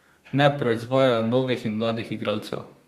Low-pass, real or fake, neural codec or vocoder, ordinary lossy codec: 14.4 kHz; fake; codec, 32 kHz, 1.9 kbps, SNAC; Opus, 64 kbps